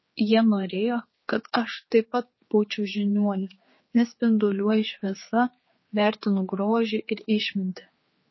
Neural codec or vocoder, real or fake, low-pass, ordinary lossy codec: codec, 16 kHz, 4 kbps, X-Codec, HuBERT features, trained on general audio; fake; 7.2 kHz; MP3, 24 kbps